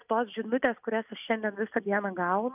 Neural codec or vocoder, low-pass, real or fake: none; 3.6 kHz; real